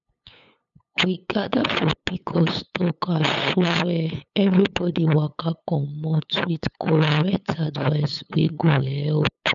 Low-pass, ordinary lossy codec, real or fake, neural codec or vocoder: 7.2 kHz; none; fake; codec, 16 kHz, 8 kbps, FunCodec, trained on LibriTTS, 25 frames a second